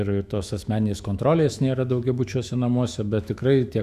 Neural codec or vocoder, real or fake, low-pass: autoencoder, 48 kHz, 128 numbers a frame, DAC-VAE, trained on Japanese speech; fake; 14.4 kHz